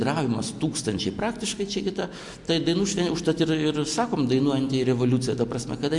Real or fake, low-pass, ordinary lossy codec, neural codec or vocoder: real; 10.8 kHz; MP3, 64 kbps; none